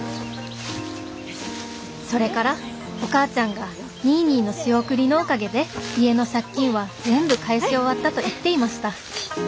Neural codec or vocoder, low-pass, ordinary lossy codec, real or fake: none; none; none; real